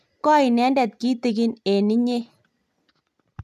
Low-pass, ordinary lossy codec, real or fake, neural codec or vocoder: 14.4 kHz; MP3, 96 kbps; real; none